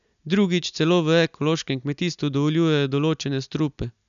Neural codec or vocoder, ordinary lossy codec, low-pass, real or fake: none; none; 7.2 kHz; real